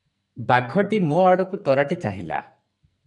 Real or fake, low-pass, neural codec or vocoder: fake; 10.8 kHz; codec, 44.1 kHz, 2.6 kbps, SNAC